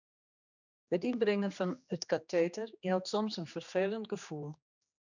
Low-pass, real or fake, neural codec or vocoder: 7.2 kHz; fake; codec, 16 kHz, 2 kbps, X-Codec, HuBERT features, trained on general audio